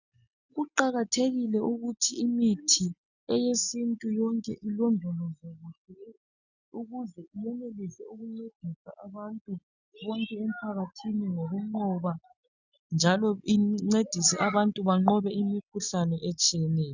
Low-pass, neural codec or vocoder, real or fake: 7.2 kHz; none; real